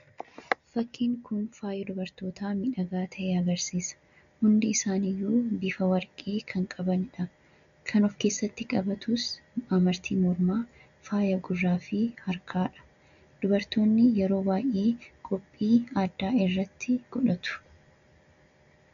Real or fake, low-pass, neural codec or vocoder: real; 7.2 kHz; none